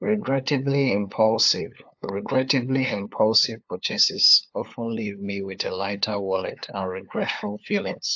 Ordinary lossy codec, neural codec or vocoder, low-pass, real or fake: AAC, 48 kbps; codec, 16 kHz, 2 kbps, FunCodec, trained on LibriTTS, 25 frames a second; 7.2 kHz; fake